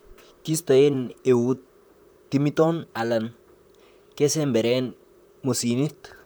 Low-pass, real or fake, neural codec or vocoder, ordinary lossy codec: none; fake; vocoder, 44.1 kHz, 128 mel bands, Pupu-Vocoder; none